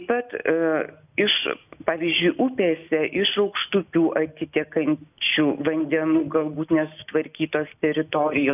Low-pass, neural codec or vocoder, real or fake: 3.6 kHz; none; real